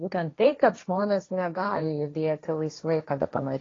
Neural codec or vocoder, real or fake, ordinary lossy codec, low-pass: codec, 16 kHz, 1.1 kbps, Voila-Tokenizer; fake; AAC, 32 kbps; 7.2 kHz